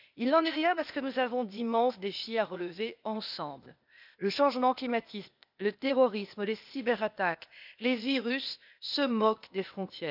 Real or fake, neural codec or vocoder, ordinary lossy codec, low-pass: fake; codec, 16 kHz, 0.8 kbps, ZipCodec; none; 5.4 kHz